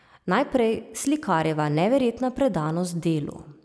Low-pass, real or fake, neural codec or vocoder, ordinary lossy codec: none; real; none; none